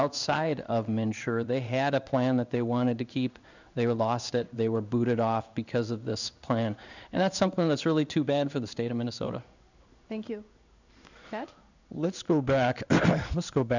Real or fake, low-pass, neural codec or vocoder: fake; 7.2 kHz; codec, 16 kHz in and 24 kHz out, 1 kbps, XY-Tokenizer